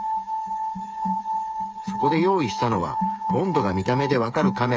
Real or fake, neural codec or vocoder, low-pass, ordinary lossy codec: fake; codec, 16 kHz, 8 kbps, FreqCodec, smaller model; none; none